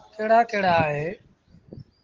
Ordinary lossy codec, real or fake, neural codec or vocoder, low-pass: Opus, 16 kbps; real; none; 7.2 kHz